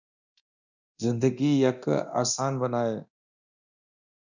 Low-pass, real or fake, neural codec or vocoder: 7.2 kHz; fake; codec, 24 kHz, 0.9 kbps, DualCodec